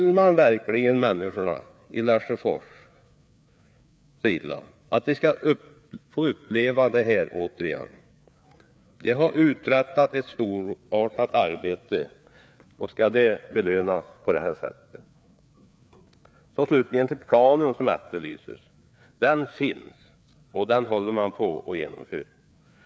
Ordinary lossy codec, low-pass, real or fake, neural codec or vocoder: none; none; fake; codec, 16 kHz, 4 kbps, FreqCodec, larger model